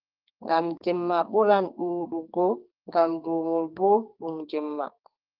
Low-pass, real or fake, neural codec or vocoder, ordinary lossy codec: 5.4 kHz; fake; codec, 32 kHz, 1.9 kbps, SNAC; Opus, 24 kbps